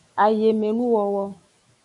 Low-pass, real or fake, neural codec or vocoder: 10.8 kHz; fake; autoencoder, 48 kHz, 128 numbers a frame, DAC-VAE, trained on Japanese speech